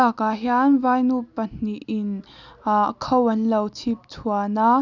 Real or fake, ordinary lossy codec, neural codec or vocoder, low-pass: real; none; none; 7.2 kHz